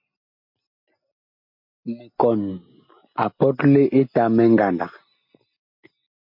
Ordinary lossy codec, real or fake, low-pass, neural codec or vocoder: MP3, 32 kbps; real; 5.4 kHz; none